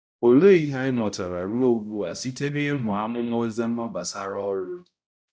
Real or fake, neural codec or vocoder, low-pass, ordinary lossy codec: fake; codec, 16 kHz, 0.5 kbps, X-Codec, HuBERT features, trained on balanced general audio; none; none